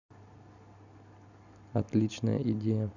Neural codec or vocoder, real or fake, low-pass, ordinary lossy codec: none; real; 7.2 kHz; none